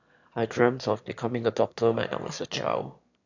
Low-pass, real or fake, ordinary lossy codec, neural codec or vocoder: 7.2 kHz; fake; none; autoencoder, 22.05 kHz, a latent of 192 numbers a frame, VITS, trained on one speaker